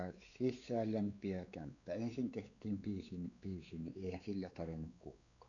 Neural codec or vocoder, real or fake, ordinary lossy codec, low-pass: codec, 16 kHz, 4 kbps, X-Codec, WavLM features, trained on Multilingual LibriSpeech; fake; none; 7.2 kHz